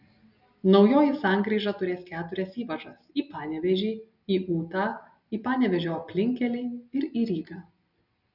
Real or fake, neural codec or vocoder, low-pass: real; none; 5.4 kHz